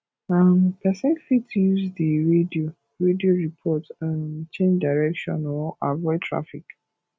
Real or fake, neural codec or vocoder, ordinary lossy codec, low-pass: real; none; none; none